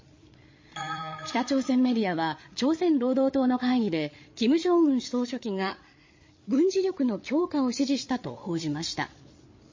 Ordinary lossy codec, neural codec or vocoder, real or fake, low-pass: MP3, 32 kbps; codec, 16 kHz, 8 kbps, FreqCodec, larger model; fake; 7.2 kHz